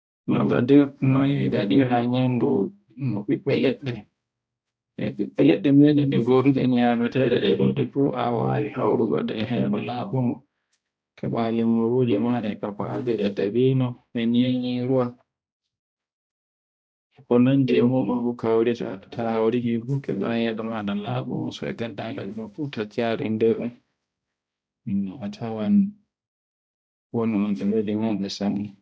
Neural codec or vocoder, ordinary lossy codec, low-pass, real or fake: codec, 16 kHz, 1 kbps, X-Codec, HuBERT features, trained on balanced general audio; none; none; fake